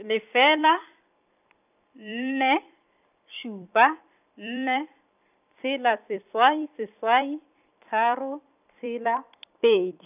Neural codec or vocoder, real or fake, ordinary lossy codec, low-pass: vocoder, 44.1 kHz, 128 mel bands every 256 samples, BigVGAN v2; fake; none; 3.6 kHz